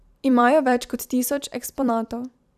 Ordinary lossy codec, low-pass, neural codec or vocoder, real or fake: none; 14.4 kHz; vocoder, 44.1 kHz, 128 mel bands every 256 samples, BigVGAN v2; fake